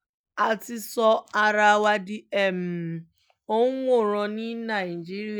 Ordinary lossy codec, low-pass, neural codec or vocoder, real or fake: none; none; none; real